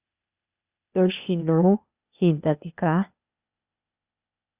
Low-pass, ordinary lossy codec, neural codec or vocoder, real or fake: 3.6 kHz; Opus, 64 kbps; codec, 16 kHz, 0.8 kbps, ZipCodec; fake